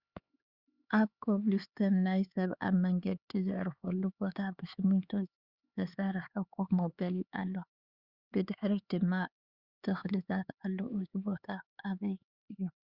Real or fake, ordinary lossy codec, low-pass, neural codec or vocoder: fake; Opus, 64 kbps; 5.4 kHz; codec, 16 kHz, 4 kbps, X-Codec, HuBERT features, trained on LibriSpeech